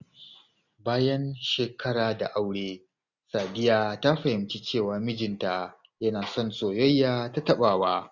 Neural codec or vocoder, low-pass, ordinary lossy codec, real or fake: none; 7.2 kHz; none; real